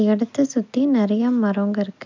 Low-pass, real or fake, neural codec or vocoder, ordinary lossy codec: 7.2 kHz; real; none; MP3, 64 kbps